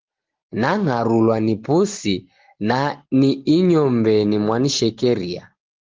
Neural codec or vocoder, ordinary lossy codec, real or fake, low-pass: none; Opus, 16 kbps; real; 7.2 kHz